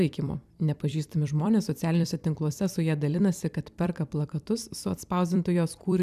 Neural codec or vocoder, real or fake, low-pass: vocoder, 44.1 kHz, 128 mel bands every 256 samples, BigVGAN v2; fake; 14.4 kHz